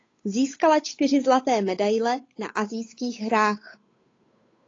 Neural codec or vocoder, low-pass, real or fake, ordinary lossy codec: codec, 16 kHz, 8 kbps, FunCodec, trained on Chinese and English, 25 frames a second; 7.2 kHz; fake; AAC, 32 kbps